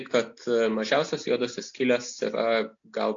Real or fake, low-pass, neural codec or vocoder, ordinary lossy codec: real; 7.2 kHz; none; AAC, 48 kbps